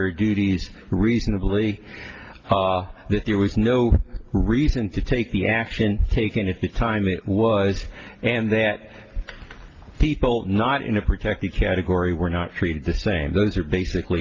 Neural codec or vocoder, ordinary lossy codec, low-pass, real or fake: none; Opus, 24 kbps; 7.2 kHz; real